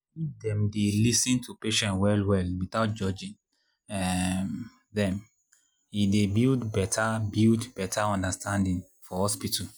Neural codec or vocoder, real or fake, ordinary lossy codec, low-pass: none; real; none; none